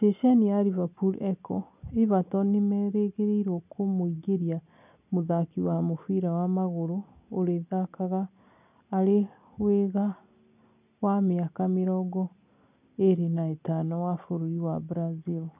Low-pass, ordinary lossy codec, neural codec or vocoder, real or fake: 3.6 kHz; none; none; real